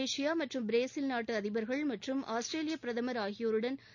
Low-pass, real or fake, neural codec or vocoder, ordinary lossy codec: 7.2 kHz; real; none; none